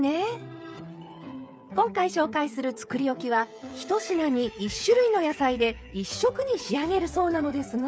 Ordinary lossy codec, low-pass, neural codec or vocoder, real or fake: none; none; codec, 16 kHz, 8 kbps, FreqCodec, smaller model; fake